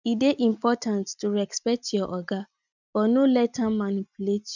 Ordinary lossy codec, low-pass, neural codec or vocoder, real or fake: none; 7.2 kHz; none; real